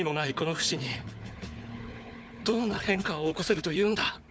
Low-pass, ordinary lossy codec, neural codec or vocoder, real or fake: none; none; codec, 16 kHz, 4 kbps, FunCodec, trained on Chinese and English, 50 frames a second; fake